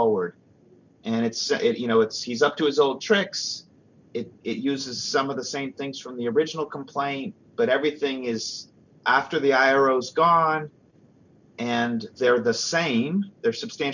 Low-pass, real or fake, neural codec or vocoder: 7.2 kHz; real; none